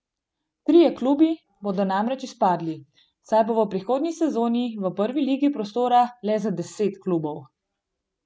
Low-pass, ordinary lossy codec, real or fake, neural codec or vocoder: none; none; real; none